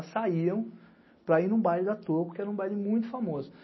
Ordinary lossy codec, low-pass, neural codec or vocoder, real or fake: MP3, 24 kbps; 7.2 kHz; none; real